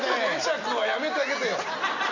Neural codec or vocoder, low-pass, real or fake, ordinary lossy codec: none; 7.2 kHz; real; none